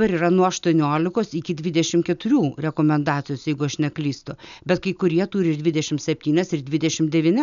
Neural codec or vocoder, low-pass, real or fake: none; 7.2 kHz; real